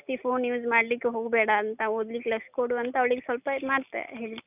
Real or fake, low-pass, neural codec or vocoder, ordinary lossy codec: real; 3.6 kHz; none; none